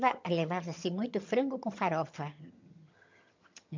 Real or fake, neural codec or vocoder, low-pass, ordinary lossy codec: fake; vocoder, 22.05 kHz, 80 mel bands, HiFi-GAN; 7.2 kHz; MP3, 64 kbps